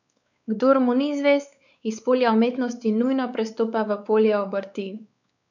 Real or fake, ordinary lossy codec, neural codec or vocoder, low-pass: fake; none; codec, 16 kHz, 4 kbps, X-Codec, WavLM features, trained on Multilingual LibriSpeech; 7.2 kHz